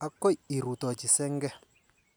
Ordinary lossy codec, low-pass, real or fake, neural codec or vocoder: none; none; real; none